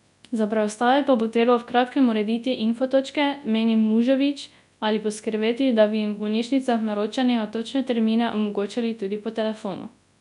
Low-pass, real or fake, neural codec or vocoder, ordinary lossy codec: 10.8 kHz; fake; codec, 24 kHz, 0.9 kbps, WavTokenizer, large speech release; none